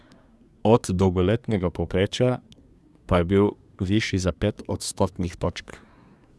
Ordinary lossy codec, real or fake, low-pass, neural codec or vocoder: none; fake; none; codec, 24 kHz, 1 kbps, SNAC